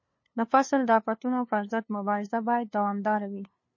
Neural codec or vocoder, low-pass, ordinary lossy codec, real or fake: codec, 16 kHz, 2 kbps, FunCodec, trained on LibriTTS, 25 frames a second; 7.2 kHz; MP3, 32 kbps; fake